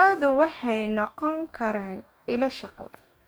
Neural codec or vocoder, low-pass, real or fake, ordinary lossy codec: codec, 44.1 kHz, 2.6 kbps, DAC; none; fake; none